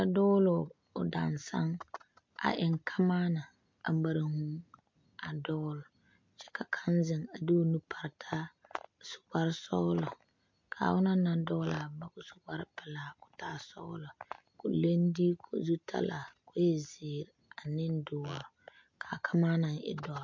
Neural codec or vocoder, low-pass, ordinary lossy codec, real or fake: none; 7.2 kHz; MP3, 48 kbps; real